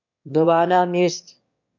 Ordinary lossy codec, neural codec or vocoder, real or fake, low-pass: MP3, 48 kbps; autoencoder, 22.05 kHz, a latent of 192 numbers a frame, VITS, trained on one speaker; fake; 7.2 kHz